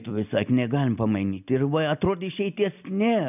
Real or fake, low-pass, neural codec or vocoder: real; 3.6 kHz; none